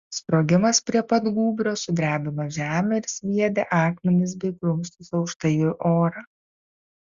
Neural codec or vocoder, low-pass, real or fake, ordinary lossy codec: none; 7.2 kHz; real; Opus, 64 kbps